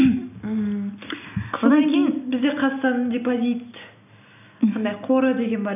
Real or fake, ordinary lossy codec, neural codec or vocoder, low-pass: real; none; none; 3.6 kHz